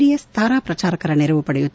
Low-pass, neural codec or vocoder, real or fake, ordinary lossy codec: none; none; real; none